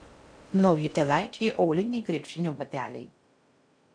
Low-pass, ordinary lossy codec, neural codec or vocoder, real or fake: 9.9 kHz; MP3, 64 kbps; codec, 16 kHz in and 24 kHz out, 0.6 kbps, FocalCodec, streaming, 4096 codes; fake